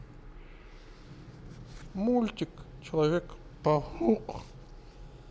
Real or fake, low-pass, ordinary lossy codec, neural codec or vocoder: real; none; none; none